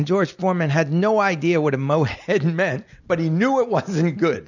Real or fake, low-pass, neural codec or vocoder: real; 7.2 kHz; none